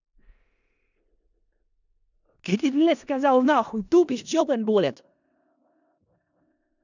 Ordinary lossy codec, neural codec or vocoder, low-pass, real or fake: none; codec, 16 kHz in and 24 kHz out, 0.4 kbps, LongCat-Audio-Codec, four codebook decoder; 7.2 kHz; fake